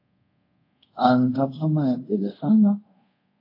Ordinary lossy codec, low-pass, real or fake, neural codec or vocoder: AAC, 32 kbps; 5.4 kHz; fake; codec, 24 kHz, 0.5 kbps, DualCodec